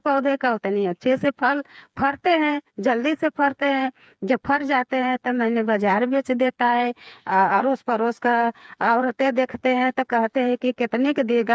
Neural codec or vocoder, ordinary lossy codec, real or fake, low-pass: codec, 16 kHz, 4 kbps, FreqCodec, smaller model; none; fake; none